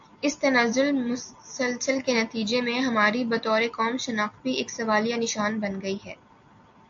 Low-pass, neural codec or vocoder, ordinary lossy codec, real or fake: 7.2 kHz; none; AAC, 64 kbps; real